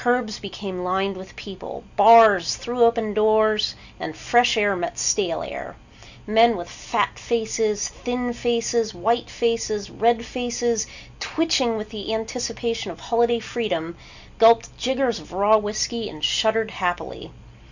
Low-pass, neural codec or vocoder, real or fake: 7.2 kHz; none; real